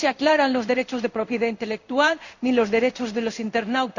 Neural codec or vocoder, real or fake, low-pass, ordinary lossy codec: codec, 16 kHz in and 24 kHz out, 1 kbps, XY-Tokenizer; fake; 7.2 kHz; none